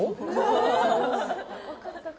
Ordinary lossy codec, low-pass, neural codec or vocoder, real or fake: none; none; none; real